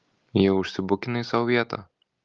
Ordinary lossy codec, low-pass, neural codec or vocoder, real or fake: Opus, 32 kbps; 7.2 kHz; none; real